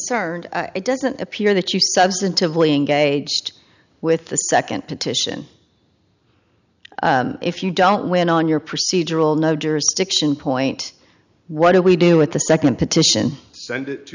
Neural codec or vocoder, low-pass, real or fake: none; 7.2 kHz; real